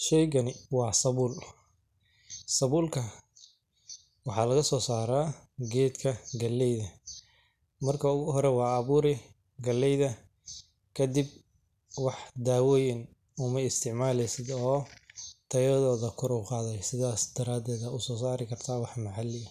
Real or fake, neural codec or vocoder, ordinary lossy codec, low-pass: real; none; none; 14.4 kHz